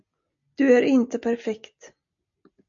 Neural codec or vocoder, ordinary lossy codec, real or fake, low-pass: none; MP3, 48 kbps; real; 7.2 kHz